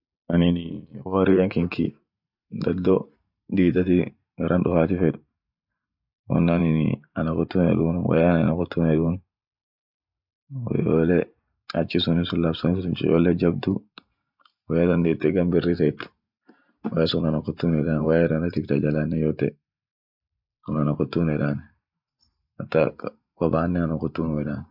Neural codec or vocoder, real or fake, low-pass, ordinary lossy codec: vocoder, 44.1 kHz, 128 mel bands every 512 samples, BigVGAN v2; fake; 5.4 kHz; none